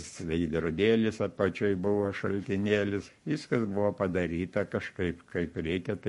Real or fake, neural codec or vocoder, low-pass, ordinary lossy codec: fake; codec, 44.1 kHz, 7.8 kbps, Pupu-Codec; 14.4 kHz; MP3, 48 kbps